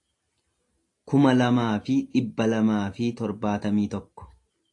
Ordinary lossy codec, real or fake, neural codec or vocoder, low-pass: AAC, 48 kbps; real; none; 10.8 kHz